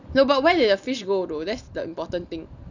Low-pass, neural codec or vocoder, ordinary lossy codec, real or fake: 7.2 kHz; none; none; real